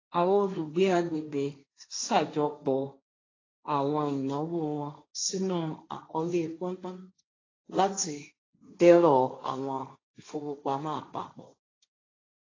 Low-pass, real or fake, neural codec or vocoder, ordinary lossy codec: 7.2 kHz; fake; codec, 16 kHz, 1.1 kbps, Voila-Tokenizer; AAC, 32 kbps